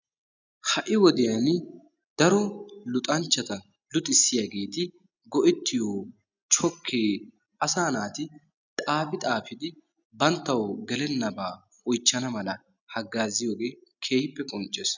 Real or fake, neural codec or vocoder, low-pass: real; none; 7.2 kHz